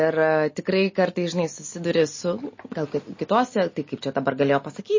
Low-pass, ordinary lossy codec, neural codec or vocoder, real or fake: 7.2 kHz; MP3, 32 kbps; none; real